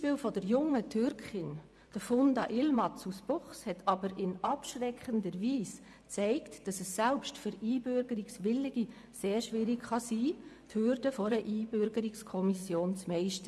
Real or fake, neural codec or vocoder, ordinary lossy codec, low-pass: fake; vocoder, 24 kHz, 100 mel bands, Vocos; none; none